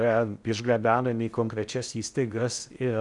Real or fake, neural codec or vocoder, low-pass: fake; codec, 16 kHz in and 24 kHz out, 0.6 kbps, FocalCodec, streaming, 4096 codes; 10.8 kHz